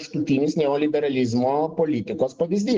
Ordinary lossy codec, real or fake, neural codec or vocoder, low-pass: Opus, 16 kbps; real; none; 7.2 kHz